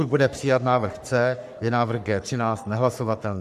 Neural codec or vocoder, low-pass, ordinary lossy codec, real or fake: codec, 44.1 kHz, 3.4 kbps, Pupu-Codec; 14.4 kHz; MP3, 96 kbps; fake